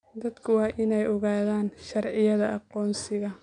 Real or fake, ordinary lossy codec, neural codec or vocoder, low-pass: real; none; none; 9.9 kHz